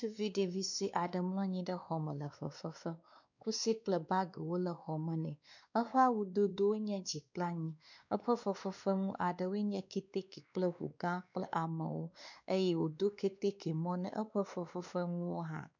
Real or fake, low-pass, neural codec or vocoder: fake; 7.2 kHz; codec, 16 kHz, 2 kbps, X-Codec, WavLM features, trained on Multilingual LibriSpeech